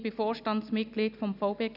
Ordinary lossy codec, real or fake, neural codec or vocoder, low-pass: none; real; none; 5.4 kHz